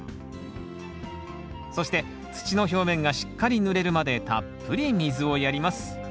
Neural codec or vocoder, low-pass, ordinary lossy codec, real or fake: none; none; none; real